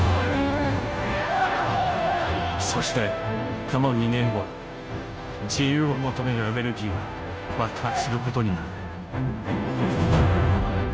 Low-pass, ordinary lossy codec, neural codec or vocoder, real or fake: none; none; codec, 16 kHz, 0.5 kbps, FunCodec, trained on Chinese and English, 25 frames a second; fake